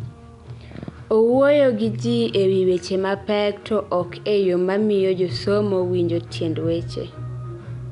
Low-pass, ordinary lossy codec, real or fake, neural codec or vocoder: 10.8 kHz; none; real; none